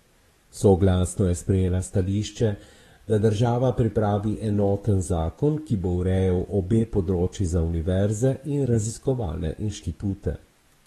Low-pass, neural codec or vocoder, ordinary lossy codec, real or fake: 19.8 kHz; codec, 44.1 kHz, 7.8 kbps, DAC; AAC, 32 kbps; fake